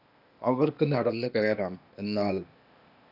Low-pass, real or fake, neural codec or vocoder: 5.4 kHz; fake; codec, 16 kHz, 0.8 kbps, ZipCodec